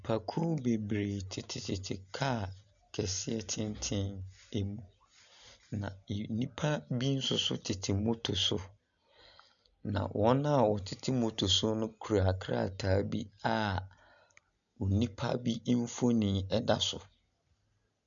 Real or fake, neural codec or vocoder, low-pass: real; none; 7.2 kHz